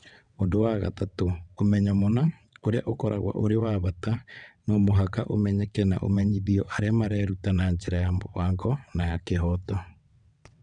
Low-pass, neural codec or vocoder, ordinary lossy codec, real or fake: 9.9 kHz; vocoder, 22.05 kHz, 80 mel bands, WaveNeXt; none; fake